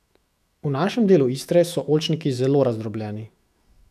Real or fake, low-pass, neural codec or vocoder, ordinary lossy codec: fake; 14.4 kHz; autoencoder, 48 kHz, 128 numbers a frame, DAC-VAE, trained on Japanese speech; none